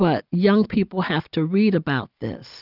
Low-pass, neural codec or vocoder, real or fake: 5.4 kHz; none; real